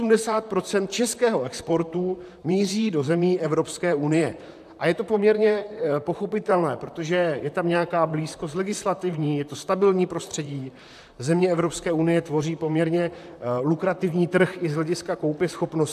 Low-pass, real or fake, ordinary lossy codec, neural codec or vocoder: 14.4 kHz; fake; AAC, 96 kbps; vocoder, 44.1 kHz, 128 mel bands, Pupu-Vocoder